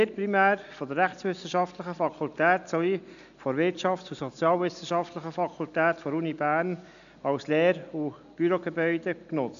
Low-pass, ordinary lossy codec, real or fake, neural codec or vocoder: 7.2 kHz; none; real; none